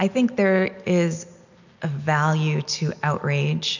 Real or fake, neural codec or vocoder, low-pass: real; none; 7.2 kHz